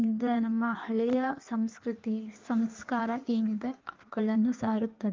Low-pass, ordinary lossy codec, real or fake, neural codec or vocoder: 7.2 kHz; Opus, 32 kbps; fake; codec, 16 kHz in and 24 kHz out, 1.1 kbps, FireRedTTS-2 codec